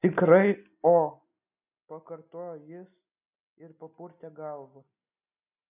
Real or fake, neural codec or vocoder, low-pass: real; none; 3.6 kHz